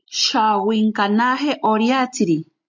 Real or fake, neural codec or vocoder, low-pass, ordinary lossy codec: real; none; 7.2 kHz; MP3, 64 kbps